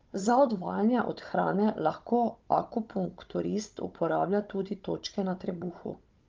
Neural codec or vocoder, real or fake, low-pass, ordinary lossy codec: codec, 16 kHz, 16 kbps, FunCodec, trained on Chinese and English, 50 frames a second; fake; 7.2 kHz; Opus, 24 kbps